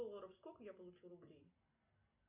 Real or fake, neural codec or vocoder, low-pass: real; none; 3.6 kHz